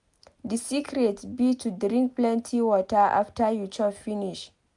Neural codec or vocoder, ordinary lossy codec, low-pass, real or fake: none; none; 10.8 kHz; real